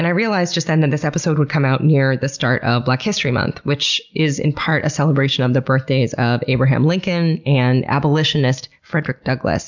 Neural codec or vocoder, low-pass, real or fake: none; 7.2 kHz; real